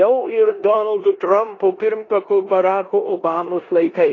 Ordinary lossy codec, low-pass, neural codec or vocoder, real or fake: AAC, 32 kbps; 7.2 kHz; codec, 16 kHz in and 24 kHz out, 0.9 kbps, LongCat-Audio-Codec, four codebook decoder; fake